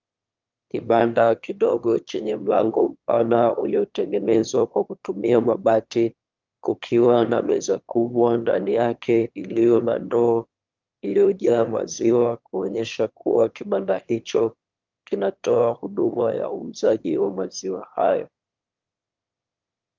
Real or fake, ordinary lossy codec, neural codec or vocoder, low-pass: fake; Opus, 32 kbps; autoencoder, 22.05 kHz, a latent of 192 numbers a frame, VITS, trained on one speaker; 7.2 kHz